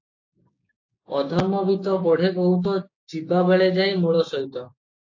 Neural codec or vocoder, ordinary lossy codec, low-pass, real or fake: codec, 16 kHz, 6 kbps, DAC; AAC, 48 kbps; 7.2 kHz; fake